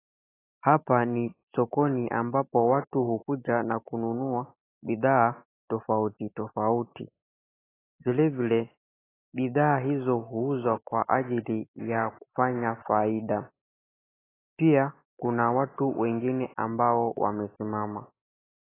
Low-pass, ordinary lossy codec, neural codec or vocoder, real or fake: 3.6 kHz; AAC, 16 kbps; none; real